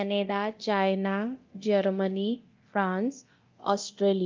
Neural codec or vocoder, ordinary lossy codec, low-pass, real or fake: codec, 24 kHz, 0.9 kbps, DualCodec; Opus, 32 kbps; 7.2 kHz; fake